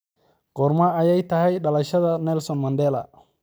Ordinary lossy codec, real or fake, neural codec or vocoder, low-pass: none; real; none; none